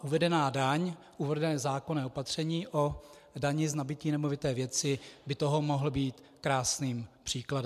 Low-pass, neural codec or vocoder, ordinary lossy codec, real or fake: 14.4 kHz; none; MP3, 96 kbps; real